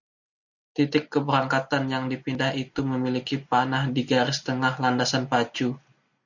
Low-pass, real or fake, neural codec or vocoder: 7.2 kHz; real; none